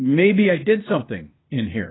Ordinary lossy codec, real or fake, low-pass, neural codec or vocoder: AAC, 16 kbps; fake; 7.2 kHz; codec, 16 kHz, 0.8 kbps, ZipCodec